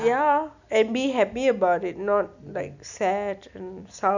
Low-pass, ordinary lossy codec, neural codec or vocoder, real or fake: 7.2 kHz; none; none; real